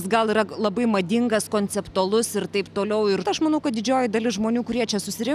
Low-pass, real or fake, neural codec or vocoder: 14.4 kHz; real; none